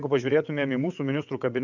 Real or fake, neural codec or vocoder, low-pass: fake; vocoder, 22.05 kHz, 80 mel bands, WaveNeXt; 7.2 kHz